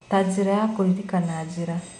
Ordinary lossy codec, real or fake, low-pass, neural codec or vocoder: none; real; 10.8 kHz; none